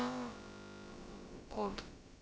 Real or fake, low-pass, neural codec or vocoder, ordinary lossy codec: fake; none; codec, 16 kHz, about 1 kbps, DyCAST, with the encoder's durations; none